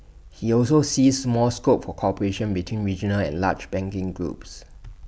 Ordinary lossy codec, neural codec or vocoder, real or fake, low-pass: none; none; real; none